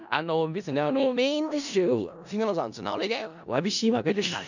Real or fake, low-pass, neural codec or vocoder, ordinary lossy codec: fake; 7.2 kHz; codec, 16 kHz in and 24 kHz out, 0.4 kbps, LongCat-Audio-Codec, four codebook decoder; none